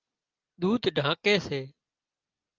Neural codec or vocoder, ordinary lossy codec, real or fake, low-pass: none; Opus, 32 kbps; real; 7.2 kHz